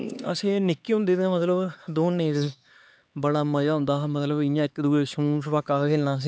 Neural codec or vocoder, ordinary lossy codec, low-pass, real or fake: codec, 16 kHz, 4 kbps, X-Codec, HuBERT features, trained on LibriSpeech; none; none; fake